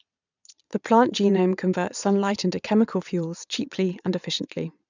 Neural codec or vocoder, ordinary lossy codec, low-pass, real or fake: vocoder, 22.05 kHz, 80 mel bands, Vocos; none; 7.2 kHz; fake